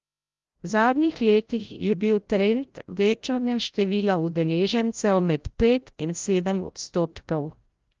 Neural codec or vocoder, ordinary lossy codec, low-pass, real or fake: codec, 16 kHz, 0.5 kbps, FreqCodec, larger model; Opus, 32 kbps; 7.2 kHz; fake